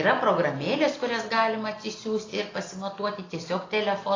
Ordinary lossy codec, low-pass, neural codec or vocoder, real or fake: AAC, 32 kbps; 7.2 kHz; none; real